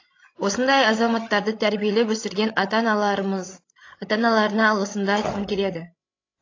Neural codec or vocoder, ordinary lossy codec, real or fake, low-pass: codec, 16 kHz, 16 kbps, FreqCodec, larger model; AAC, 32 kbps; fake; 7.2 kHz